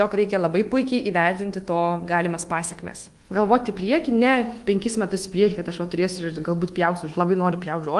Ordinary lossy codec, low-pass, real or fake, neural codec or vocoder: Opus, 24 kbps; 10.8 kHz; fake; codec, 24 kHz, 1.2 kbps, DualCodec